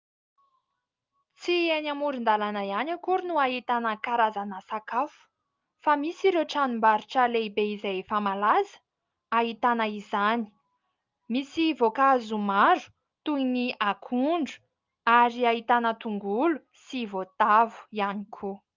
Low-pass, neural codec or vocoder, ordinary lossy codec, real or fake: 7.2 kHz; none; Opus, 24 kbps; real